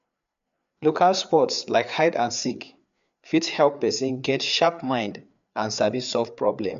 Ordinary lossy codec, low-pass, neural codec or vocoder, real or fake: MP3, 64 kbps; 7.2 kHz; codec, 16 kHz, 4 kbps, FreqCodec, larger model; fake